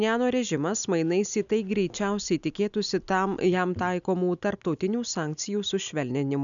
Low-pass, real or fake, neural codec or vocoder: 7.2 kHz; real; none